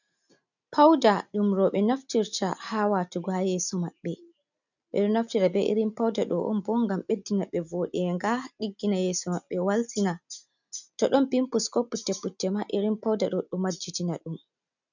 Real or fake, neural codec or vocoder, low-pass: real; none; 7.2 kHz